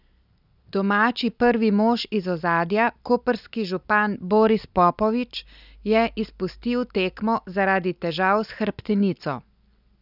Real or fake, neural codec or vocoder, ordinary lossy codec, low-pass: real; none; none; 5.4 kHz